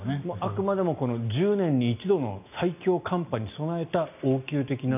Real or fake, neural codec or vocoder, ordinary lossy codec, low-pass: real; none; none; 3.6 kHz